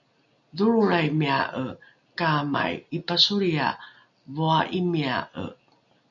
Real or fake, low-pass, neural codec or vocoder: real; 7.2 kHz; none